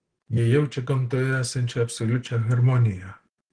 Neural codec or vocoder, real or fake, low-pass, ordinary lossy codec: none; real; 9.9 kHz; Opus, 16 kbps